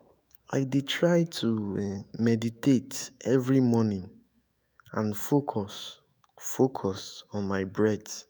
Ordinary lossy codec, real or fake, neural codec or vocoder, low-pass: none; fake; autoencoder, 48 kHz, 128 numbers a frame, DAC-VAE, trained on Japanese speech; none